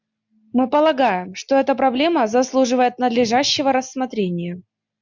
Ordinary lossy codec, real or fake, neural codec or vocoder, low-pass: MP3, 64 kbps; real; none; 7.2 kHz